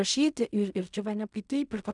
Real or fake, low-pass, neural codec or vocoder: fake; 10.8 kHz; codec, 16 kHz in and 24 kHz out, 0.4 kbps, LongCat-Audio-Codec, fine tuned four codebook decoder